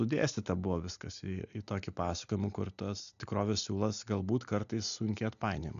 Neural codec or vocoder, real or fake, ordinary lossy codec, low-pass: none; real; MP3, 96 kbps; 7.2 kHz